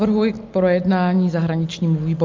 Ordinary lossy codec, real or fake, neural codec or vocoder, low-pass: Opus, 24 kbps; real; none; 7.2 kHz